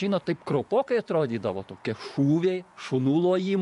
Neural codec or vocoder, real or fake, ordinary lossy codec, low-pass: vocoder, 24 kHz, 100 mel bands, Vocos; fake; AAC, 96 kbps; 10.8 kHz